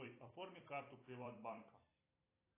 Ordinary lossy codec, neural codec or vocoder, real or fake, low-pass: AAC, 24 kbps; vocoder, 44.1 kHz, 128 mel bands every 256 samples, BigVGAN v2; fake; 3.6 kHz